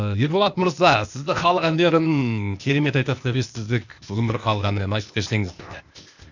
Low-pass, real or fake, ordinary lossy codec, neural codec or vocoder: 7.2 kHz; fake; none; codec, 16 kHz, 0.8 kbps, ZipCodec